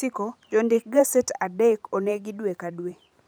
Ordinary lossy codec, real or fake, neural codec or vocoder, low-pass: none; fake; vocoder, 44.1 kHz, 128 mel bands every 512 samples, BigVGAN v2; none